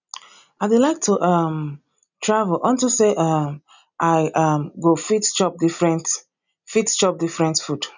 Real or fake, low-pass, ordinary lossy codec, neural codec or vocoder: real; 7.2 kHz; none; none